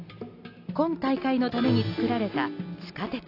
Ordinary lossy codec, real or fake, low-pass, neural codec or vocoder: AAC, 32 kbps; real; 5.4 kHz; none